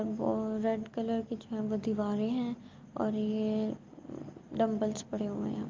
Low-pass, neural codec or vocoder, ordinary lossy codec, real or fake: 7.2 kHz; none; Opus, 24 kbps; real